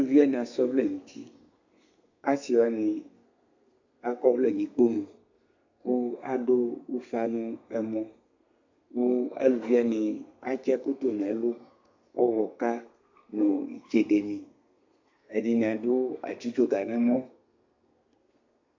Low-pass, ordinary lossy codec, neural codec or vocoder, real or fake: 7.2 kHz; AAC, 48 kbps; codec, 32 kHz, 1.9 kbps, SNAC; fake